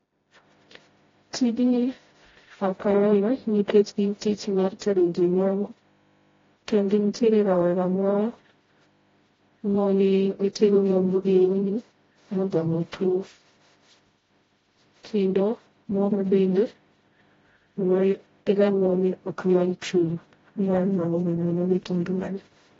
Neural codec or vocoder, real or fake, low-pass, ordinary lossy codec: codec, 16 kHz, 0.5 kbps, FreqCodec, smaller model; fake; 7.2 kHz; AAC, 24 kbps